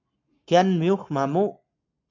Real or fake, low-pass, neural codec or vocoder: fake; 7.2 kHz; codec, 44.1 kHz, 7.8 kbps, Pupu-Codec